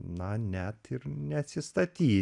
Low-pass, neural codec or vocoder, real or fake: 10.8 kHz; none; real